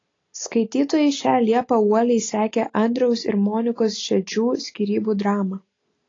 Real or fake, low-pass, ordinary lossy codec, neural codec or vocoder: real; 7.2 kHz; AAC, 32 kbps; none